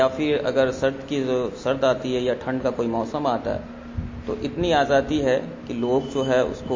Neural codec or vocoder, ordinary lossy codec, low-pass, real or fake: none; MP3, 32 kbps; 7.2 kHz; real